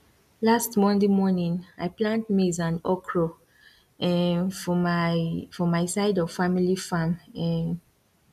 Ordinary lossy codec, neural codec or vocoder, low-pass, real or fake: none; none; 14.4 kHz; real